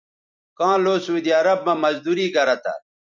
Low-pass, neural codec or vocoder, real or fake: 7.2 kHz; vocoder, 44.1 kHz, 128 mel bands every 256 samples, BigVGAN v2; fake